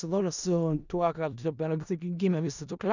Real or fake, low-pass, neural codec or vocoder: fake; 7.2 kHz; codec, 16 kHz in and 24 kHz out, 0.4 kbps, LongCat-Audio-Codec, four codebook decoder